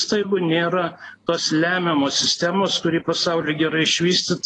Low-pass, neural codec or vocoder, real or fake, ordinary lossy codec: 10.8 kHz; none; real; AAC, 32 kbps